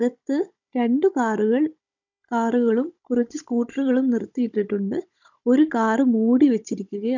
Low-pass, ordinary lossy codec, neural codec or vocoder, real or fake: 7.2 kHz; none; codec, 16 kHz, 16 kbps, FunCodec, trained on Chinese and English, 50 frames a second; fake